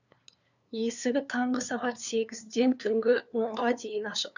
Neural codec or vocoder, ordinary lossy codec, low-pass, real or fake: codec, 16 kHz, 2 kbps, FunCodec, trained on LibriTTS, 25 frames a second; none; 7.2 kHz; fake